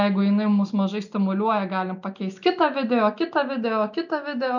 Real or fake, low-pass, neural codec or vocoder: real; 7.2 kHz; none